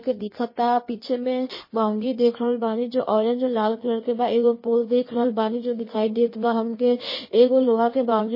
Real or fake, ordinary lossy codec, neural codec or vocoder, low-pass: fake; MP3, 24 kbps; codec, 16 kHz in and 24 kHz out, 1.1 kbps, FireRedTTS-2 codec; 5.4 kHz